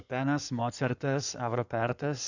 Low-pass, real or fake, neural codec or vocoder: 7.2 kHz; fake; codec, 16 kHz in and 24 kHz out, 2.2 kbps, FireRedTTS-2 codec